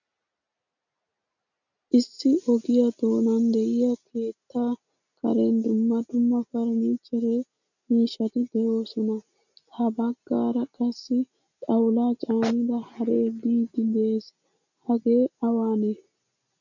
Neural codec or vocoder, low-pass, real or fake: none; 7.2 kHz; real